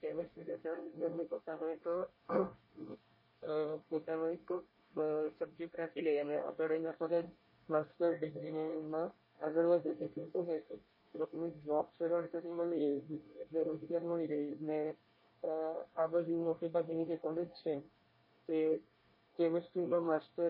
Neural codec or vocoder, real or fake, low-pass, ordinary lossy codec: codec, 24 kHz, 1 kbps, SNAC; fake; 5.4 kHz; MP3, 24 kbps